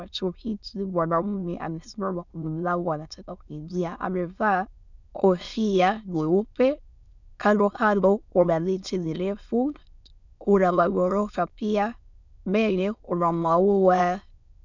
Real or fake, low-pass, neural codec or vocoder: fake; 7.2 kHz; autoencoder, 22.05 kHz, a latent of 192 numbers a frame, VITS, trained on many speakers